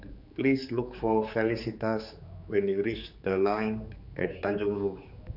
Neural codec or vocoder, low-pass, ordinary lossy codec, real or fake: codec, 16 kHz, 4 kbps, X-Codec, HuBERT features, trained on general audio; 5.4 kHz; none; fake